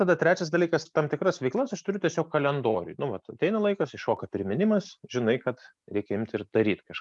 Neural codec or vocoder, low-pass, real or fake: none; 10.8 kHz; real